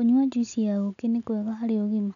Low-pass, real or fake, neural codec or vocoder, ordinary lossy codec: 7.2 kHz; real; none; none